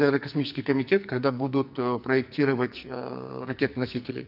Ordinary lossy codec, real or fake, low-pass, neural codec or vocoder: none; fake; 5.4 kHz; codec, 44.1 kHz, 2.6 kbps, SNAC